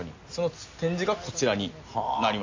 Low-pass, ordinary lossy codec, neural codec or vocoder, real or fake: 7.2 kHz; AAC, 48 kbps; none; real